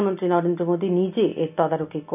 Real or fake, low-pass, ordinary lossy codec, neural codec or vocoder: real; 3.6 kHz; none; none